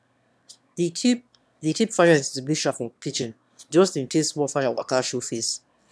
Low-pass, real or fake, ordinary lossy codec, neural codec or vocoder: none; fake; none; autoencoder, 22.05 kHz, a latent of 192 numbers a frame, VITS, trained on one speaker